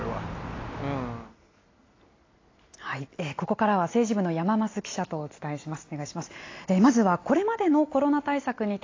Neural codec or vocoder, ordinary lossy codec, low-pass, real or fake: none; AAC, 32 kbps; 7.2 kHz; real